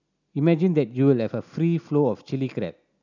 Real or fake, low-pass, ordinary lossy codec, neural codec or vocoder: real; 7.2 kHz; none; none